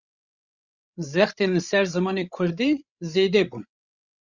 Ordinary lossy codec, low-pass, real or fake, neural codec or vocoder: Opus, 64 kbps; 7.2 kHz; fake; codec, 16 kHz, 16 kbps, FreqCodec, larger model